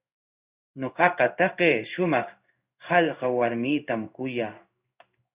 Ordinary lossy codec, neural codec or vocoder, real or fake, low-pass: Opus, 64 kbps; codec, 16 kHz in and 24 kHz out, 1 kbps, XY-Tokenizer; fake; 3.6 kHz